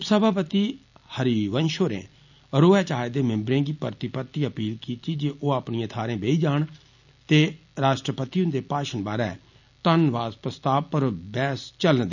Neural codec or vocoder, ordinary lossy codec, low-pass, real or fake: none; none; 7.2 kHz; real